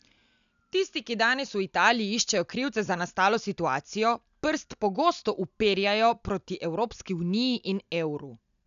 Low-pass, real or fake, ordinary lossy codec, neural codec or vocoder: 7.2 kHz; real; MP3, 96 kbps; none